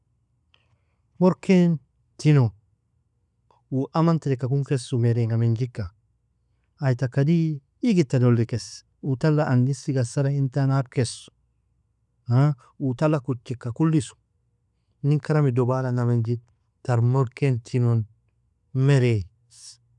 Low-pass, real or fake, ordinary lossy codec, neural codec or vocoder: 10.8 kHz; real; none; none